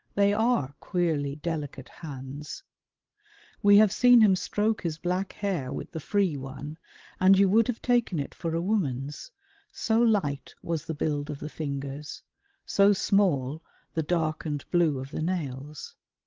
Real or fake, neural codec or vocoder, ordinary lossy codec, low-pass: fake; codec, 16 kHz, 16 kbps, FreqCodec, larger model; Opus, 16 kbps; 7.2 kHz